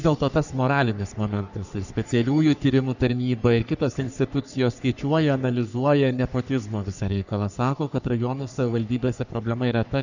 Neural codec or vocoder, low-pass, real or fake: codec, 44.1 kHz, 3.4 kbps, Pupu-Codec; 7.2 kHz; fake